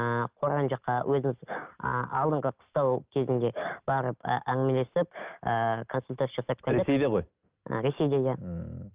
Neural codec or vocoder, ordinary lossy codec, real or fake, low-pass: none; Opus, 32 kbps; real; 3.6 kHz